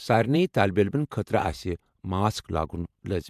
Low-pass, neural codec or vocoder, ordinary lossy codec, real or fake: 14.4 kHz; vocoder, 48 kHz, 128 mel bands, Vocos; MP3, 96 kbps; fake